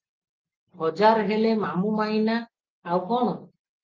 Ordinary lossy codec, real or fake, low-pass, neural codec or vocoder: Opus, 24 kbps; real; 7.2 kHz; none